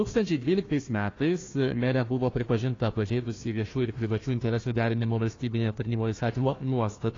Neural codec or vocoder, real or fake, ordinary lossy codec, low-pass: codec, 16 kHz, 1 kbps, FunCodec, trained on Chinese and English, 50 frames a second; fake; AAC, 32 kbps; 7.2 kHz